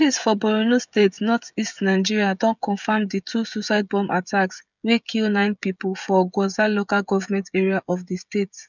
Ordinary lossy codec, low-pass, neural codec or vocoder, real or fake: none; 7.2 kHz; codec, 16 kHz, 16 kbps, FreqCodec, smaller model; fake